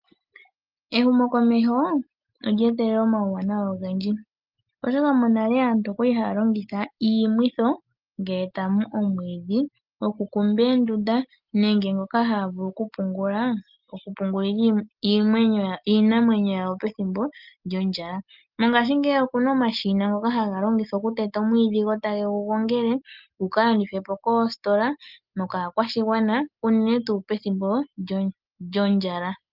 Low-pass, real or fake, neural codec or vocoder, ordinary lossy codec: 5.4 kHz; real; none; Opus, 24 kbps